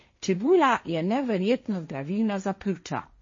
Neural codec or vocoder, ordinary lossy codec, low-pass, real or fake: codec, 16 kHz, 1.1 kbps, Voila-Tokenizer; MP3, 32 kbps; 7.2 kHz; fake